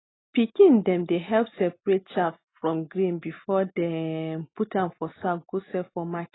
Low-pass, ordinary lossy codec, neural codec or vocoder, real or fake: 7.2 kHz; AAC, 16 kbps; none; real